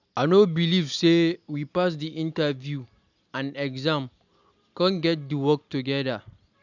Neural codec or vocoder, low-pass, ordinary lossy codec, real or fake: none; 7.2 kHz; none; real